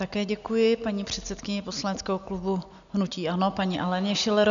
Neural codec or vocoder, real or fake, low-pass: none; real; 7.2 kHz